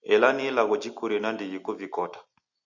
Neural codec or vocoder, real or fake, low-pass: none; real; 7.2 kHz